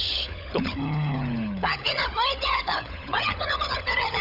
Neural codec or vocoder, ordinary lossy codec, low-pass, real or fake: codec, 16 kHz, 16 kbps, FunCodec, trained on LibriTTS, 50 frames a second; none; 5.4 kHz; fake